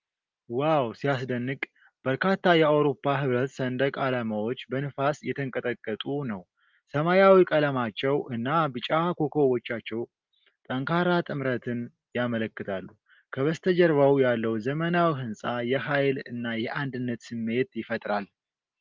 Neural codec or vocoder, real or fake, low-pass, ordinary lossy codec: none; real; 7.2 kHz; Opus, 32 kbps